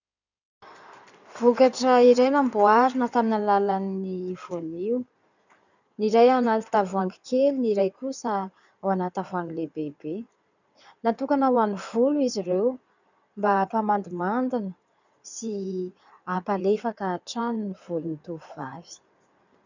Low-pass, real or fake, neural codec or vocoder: 7.2 kHz; fake; codec, 16 kHz in and 24 kHz out, 2.2 kbps, FireRedTTS-2 codec